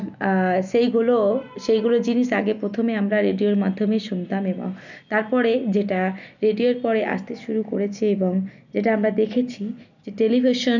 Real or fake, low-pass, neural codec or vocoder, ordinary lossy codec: real; 7.2 kHz; none; none